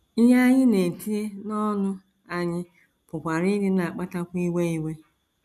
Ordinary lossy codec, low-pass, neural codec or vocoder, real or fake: none; 14.4 kHz; none; real